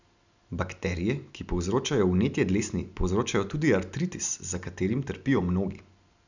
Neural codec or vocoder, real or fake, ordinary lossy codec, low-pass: none; real; none; 7.2 kHz